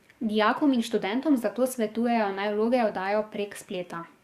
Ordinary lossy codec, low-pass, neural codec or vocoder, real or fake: Opus, 64 kbps; 14.4 kHz; codec, 44.1 kHz, 7.8 kbps, DAC; fake